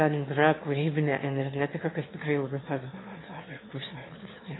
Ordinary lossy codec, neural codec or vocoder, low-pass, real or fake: AAC, 16 kbps; autoencoder, 22.05 kHz, a latent of 192 numbers a frame, VITS, trained on one speaker; 7.2 kHz; fake